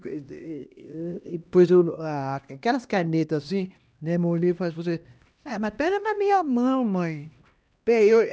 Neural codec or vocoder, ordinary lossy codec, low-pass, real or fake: codec, 16 kHz, 1 kbps, X-Codec, HuBERT features, trained on LibriSpeech; none; none; fake